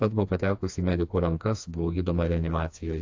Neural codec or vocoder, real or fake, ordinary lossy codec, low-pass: codec, 16 kHz, 2 kbps, FreqCodec, smaller model; fake; AAC, 48 kbps; 7.2 kHz